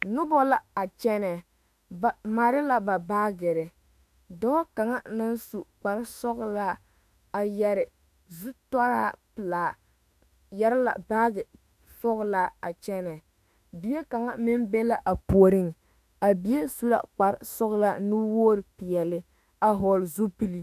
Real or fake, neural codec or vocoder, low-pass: fake; autoencoder, 48 kHz, 32 numbers a frame, DAC-VAE, trained on Japanese speech; 14.4 kHz